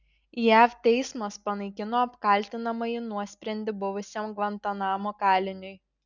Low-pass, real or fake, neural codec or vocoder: 7.2 kHz; real; none